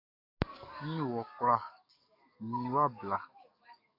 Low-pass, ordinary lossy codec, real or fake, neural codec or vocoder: 5.4 kHz; AAC, 32 kbps; fake; codec, 44.1 kHz, 7.8 kbps, DAC